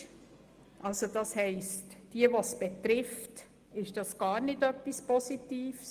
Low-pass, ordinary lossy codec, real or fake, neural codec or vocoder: 14.4 kHz; Opus, 24 kbps; real; none